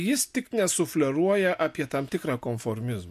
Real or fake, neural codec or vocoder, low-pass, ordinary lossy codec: real; none; 14.4 kHz; MP3, 64 kbps